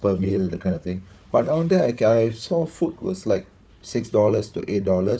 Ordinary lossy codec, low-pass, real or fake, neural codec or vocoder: none; none; fake; codec, 16 kHz, 4 kbps, FunCodec, trained on Chinese and English, 50 frames a second